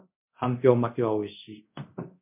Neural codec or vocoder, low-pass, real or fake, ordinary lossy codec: codec, 24 kHz, 0.5 kbps, DualCodec; 3.6 kHz; fake; MP3, 32 kbps